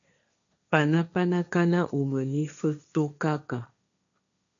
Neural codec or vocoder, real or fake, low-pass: codec, 16 kHz, 1.1 kbps, Voila-Tokenizer; fake; 7.2 kHz